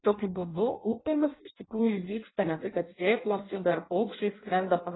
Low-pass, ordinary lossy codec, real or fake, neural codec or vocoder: 7.2 kHz; AAC, 16 kbps; fake; codec, 16 kHz in and 24 kHz out, 0.6 kbps, FireRedTTS-2 codec